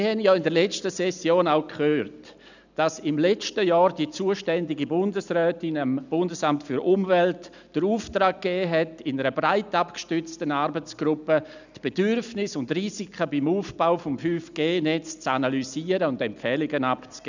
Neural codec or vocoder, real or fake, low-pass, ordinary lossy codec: none; real; 7.2 kHz; none